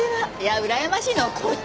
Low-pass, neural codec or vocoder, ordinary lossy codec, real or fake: none; none; none; real